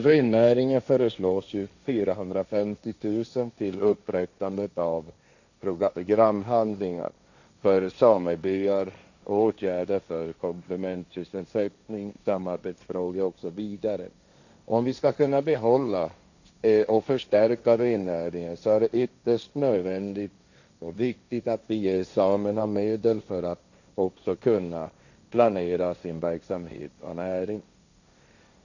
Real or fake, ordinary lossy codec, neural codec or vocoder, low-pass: fake; none; codec, 16 kHz, 1.1 kbps, Voila-Tokenizer; 7.2 kHz